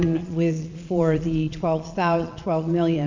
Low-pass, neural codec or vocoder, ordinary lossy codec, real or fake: 7.2 kHz; vocoder, 22.05 kHz, 80 mel bands, Vocos; MP3, 64 kbps; fake